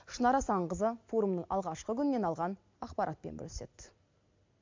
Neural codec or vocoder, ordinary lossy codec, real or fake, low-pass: none; MP3, 64 kbps; real; 7.2 kHz